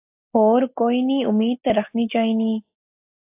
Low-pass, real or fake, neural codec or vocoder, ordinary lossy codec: 3.6 kHz; real; none; AAC, 32 kbps